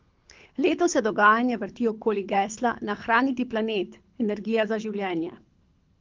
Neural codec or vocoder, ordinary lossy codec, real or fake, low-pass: codec, 24 kHz, 6 kbps, HILCodec; Opus, 32 kbps; fake; 7.2 kHz